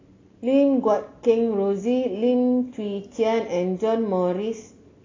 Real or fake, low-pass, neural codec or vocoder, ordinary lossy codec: real; 7.2 kHz; none; AAC, 32 kbps